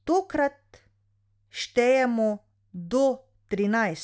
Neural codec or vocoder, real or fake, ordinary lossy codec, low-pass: none; real; none; none